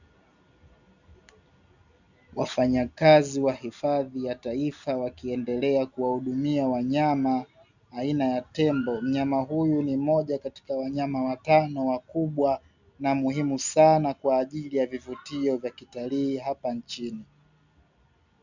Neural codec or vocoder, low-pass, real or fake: none; 7.2 kHz; real